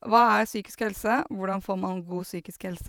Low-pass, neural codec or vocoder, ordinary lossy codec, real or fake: none; vocoder, 48 kHz, 128 mel bands, Vocos; none; fake